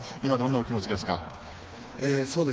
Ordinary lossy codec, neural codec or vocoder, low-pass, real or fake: none; codec, 16 kHz, 2 kbps, FreqCodec, smaller model; none; fake